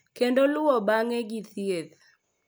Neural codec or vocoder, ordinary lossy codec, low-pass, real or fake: none; none; none; real